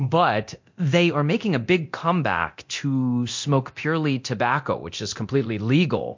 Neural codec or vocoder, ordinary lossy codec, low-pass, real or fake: codec, 24 kHz, 0.9 kbps, DualCodec; MP3, 48 kbps; 7.2 kHz; fake